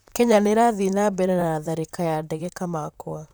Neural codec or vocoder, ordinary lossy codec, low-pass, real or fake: vocoder, 44.1 kHz, 128 mel bands, Pupu-Vocoder; none; none; fake